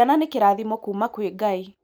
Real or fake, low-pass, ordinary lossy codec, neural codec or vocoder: real; none; none; none